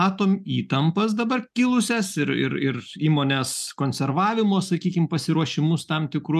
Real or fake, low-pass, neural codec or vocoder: real; 14.4 kHz; none